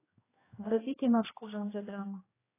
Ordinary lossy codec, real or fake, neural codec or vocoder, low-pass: AAC, 16 kbps; fake; codec, 16 kHz, 1 kbps, X-Codec, HuBERT features, trained on general audio; 3.6 kHz